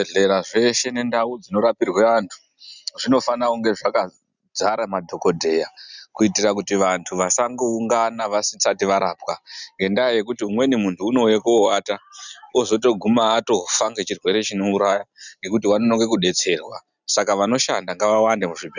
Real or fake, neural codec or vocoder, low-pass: real; none; 7.2 kHz